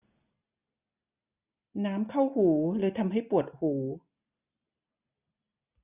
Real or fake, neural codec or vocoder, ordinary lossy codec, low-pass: real; none; none; 3.6 kHz